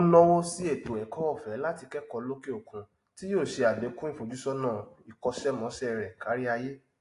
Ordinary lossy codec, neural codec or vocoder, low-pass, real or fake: AAC, 48 kbps; none; 10.8 kHz; real